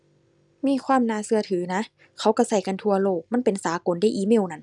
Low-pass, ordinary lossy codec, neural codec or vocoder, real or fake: 10.8 kHz; none; none; real